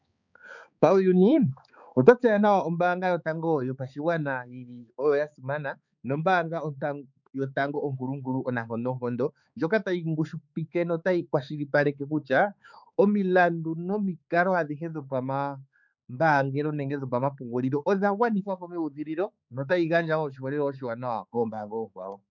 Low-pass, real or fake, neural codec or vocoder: 7.2 kHz; fake; codec, 16 kHz, 4 kbps, X-Codec, HuBERT features, trained on balanced general audio